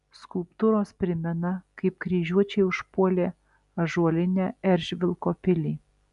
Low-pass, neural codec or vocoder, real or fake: 10.8 kHz; none; real